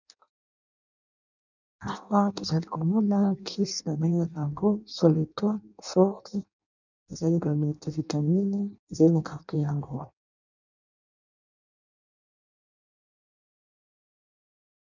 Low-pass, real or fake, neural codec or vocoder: 7.2 kHz; fake; codec, 16 kHz in and 24 kHz out, 0.6 kbps, FireRedTTS-2 codec